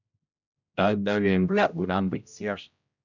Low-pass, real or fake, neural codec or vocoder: 7.2 kHz; fake; codec, 16 kHz, 0.5 kbps, X-Codec, HuBERT features, trained on general audio